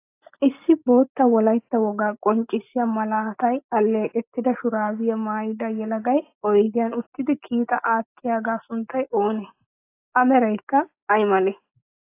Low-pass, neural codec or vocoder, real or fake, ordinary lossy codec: 3.6 kHz; none; real; AAC, 24 kbps